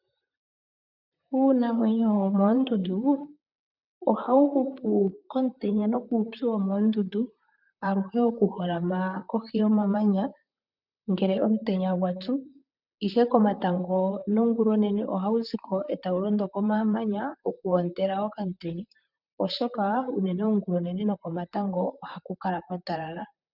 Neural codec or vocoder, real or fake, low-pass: vocoder, 44.1 kHz, 128 mel bands, Pupu-Vocoder; fake; 5.4 kHz